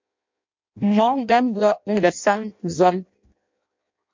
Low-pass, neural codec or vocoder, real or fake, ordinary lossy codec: 7.2 kHz; codec, 16 kHz in and 24 kHz out, 0.6 kbps, FireRedTTS-2 codec; fake; MP3, 48 kbps